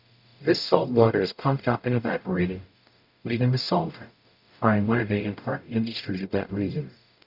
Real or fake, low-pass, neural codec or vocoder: fake; 5.4 kHz; codec, 44.1 kHz, 0.9 kbps, DAC